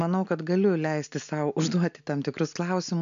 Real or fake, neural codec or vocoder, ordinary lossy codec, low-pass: real; none; MP3, 64 kbps; 7.2 kHz